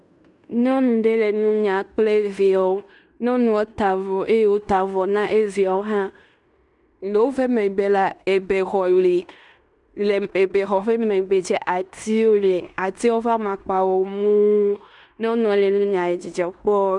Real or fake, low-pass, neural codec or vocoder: fake; 10.8 kHz; codec, 16 kHz in and 24 kHz out, 0.9 kbps, LongCat-Audio-Codec, fine tuned four codebook decoder